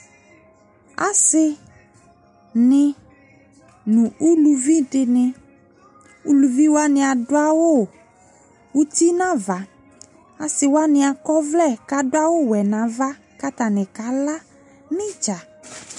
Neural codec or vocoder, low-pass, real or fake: none; 10.8 kHz; real